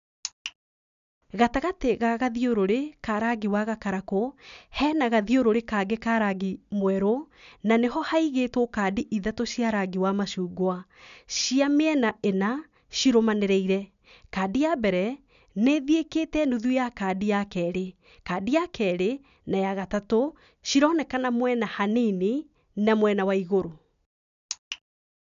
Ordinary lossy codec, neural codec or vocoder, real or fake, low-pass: none; none; real; 7.2 kHz